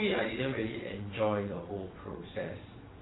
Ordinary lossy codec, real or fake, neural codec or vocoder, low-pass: AAC, 16 kbps; fake; vocoder, 22.05 kHz, 80 mel bands, WaveNeXt; 7.2 kHz